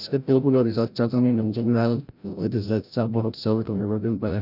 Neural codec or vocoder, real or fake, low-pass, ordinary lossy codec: codec, 16 kHz, 0.5 kbps, FreqCodec, larger model; fake; 5.4 kHz; none